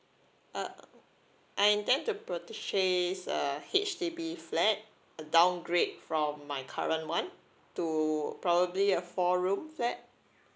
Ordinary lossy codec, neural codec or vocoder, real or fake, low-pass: none; none; real; none